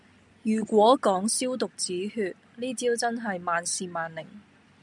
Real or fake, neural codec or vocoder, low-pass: real; none; 10.8 kHz